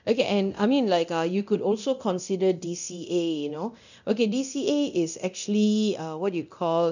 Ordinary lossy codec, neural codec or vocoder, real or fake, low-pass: none; codec, 24 kHz, 0.9 kbps, DualCodec; fake; 7.2 kHz